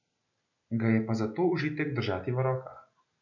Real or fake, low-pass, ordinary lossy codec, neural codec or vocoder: real; 7.2 kHz; none; none